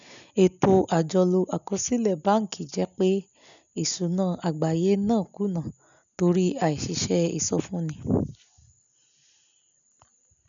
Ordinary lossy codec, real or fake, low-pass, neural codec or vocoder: none; real; 7.2 kHz; none